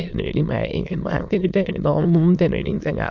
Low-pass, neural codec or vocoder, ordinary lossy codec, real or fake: 7.2 kHz; autoencoder, 22.05 kHz, a latent of 192 numbers a frame, VITS, trained on many speakers; none; fake